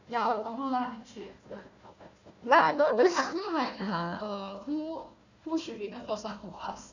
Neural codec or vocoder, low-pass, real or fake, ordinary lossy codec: codec, 16 kHz, 1 kbps, FunCodec, trained on Chinese and English, 50 frames a second; 7.2 kHz; fake; none